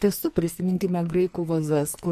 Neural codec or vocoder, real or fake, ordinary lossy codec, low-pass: codec, 44.1 kHz, 2.6 kbps, SNAC; fake; MP3, 64 kbps; 14.4 kHz